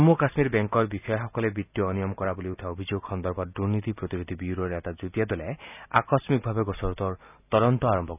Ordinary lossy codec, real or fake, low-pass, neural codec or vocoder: none; real; 3.6 kHz; none